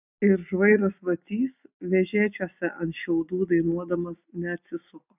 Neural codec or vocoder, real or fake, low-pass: none; real; 3.6 kHz